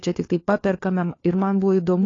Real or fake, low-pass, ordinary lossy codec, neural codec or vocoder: fake; 7.2 kHz; AAC, 32 kbps; codec, 16 kHz, 4 kbps, FunCodec, trained on LibriTTS, 50 frames a second